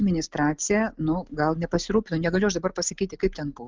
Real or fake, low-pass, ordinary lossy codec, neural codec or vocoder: real; 7.2 kHz; Opus, 32 kbps; none